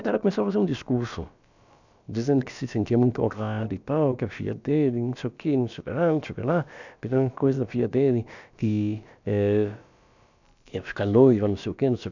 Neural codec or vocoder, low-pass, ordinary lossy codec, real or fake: codec, 16 kHz, about 1 kbps, DyCAST, with the encoder's durations; 7.2 kHz; none; fake